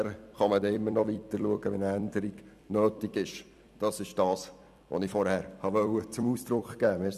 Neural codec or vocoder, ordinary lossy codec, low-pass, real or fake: vocoder, 44.1 kHz, 128 mel bands every 256 samples, BigVGAN v2; none; 14.4 kHz; fake